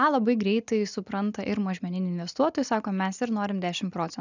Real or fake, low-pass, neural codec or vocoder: real; 7.2 kHz; none